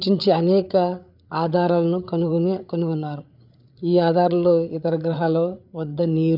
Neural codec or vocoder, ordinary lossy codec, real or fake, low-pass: codec, 16 kHz, 8 kbps, FreqCodec, larger model; none; fake; 5.4 kHz